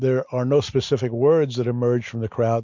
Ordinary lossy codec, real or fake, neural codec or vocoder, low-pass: MP3, 64 kbps; real; none; 7.2 kHz